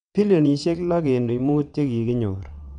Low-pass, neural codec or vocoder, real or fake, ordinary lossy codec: 9.9 kHz; vocoder, 22.05 kHz, 80 mel bands, WaveNeXt; fake; none